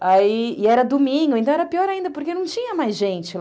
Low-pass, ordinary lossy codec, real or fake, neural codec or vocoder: none; none; real; none